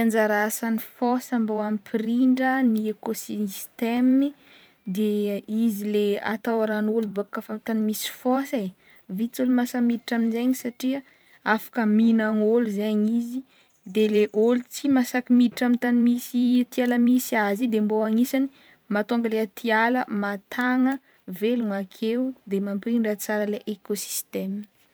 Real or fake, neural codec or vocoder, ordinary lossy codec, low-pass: fake; vocoder, 44.1 kHz, 128 mel bands every 512 samples, BigVGAN v2; none; none